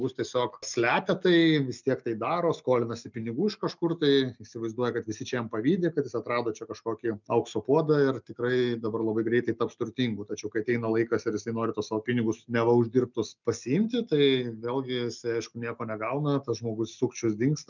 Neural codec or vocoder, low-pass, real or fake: none; 7.2 kHz; real